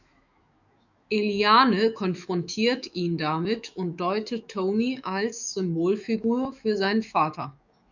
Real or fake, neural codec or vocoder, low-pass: fake; autoencoder, 48 kHz, 128 numbers a frame, DAC-VAE, trained on Japanese speech; 7.2 kHz